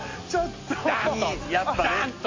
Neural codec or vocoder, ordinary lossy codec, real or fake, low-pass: none; MP3, 32 kbps; real; 7.2 kHz